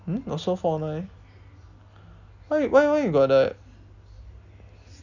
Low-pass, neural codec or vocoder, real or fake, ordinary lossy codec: 7.2 kHz; none; real; none